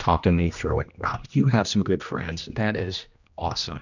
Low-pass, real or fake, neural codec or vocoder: 7.2 kHz; fake; codec, 16 kHz, 1 kbps, X-Codec, HuBERT features, trained on general audio